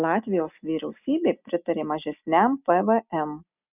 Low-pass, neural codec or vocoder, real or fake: 3.6 kHz; none; real